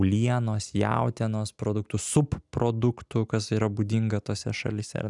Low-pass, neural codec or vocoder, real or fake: 10.8 kHz; none; real